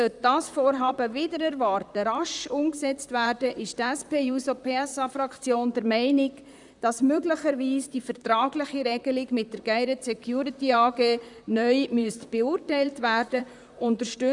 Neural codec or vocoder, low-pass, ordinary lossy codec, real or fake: vocoder, 44.1 kHz, 128 mel bands, Pupu-Vocoder; 10.8 kHz; none; fake